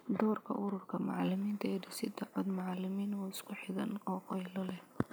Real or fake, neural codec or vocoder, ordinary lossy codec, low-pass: real; none; none; none